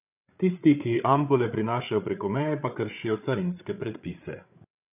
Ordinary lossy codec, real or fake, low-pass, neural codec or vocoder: none; fake; 3.6 kHz; codec, 16 kHz, 8 kbps, FreqCodec, larger model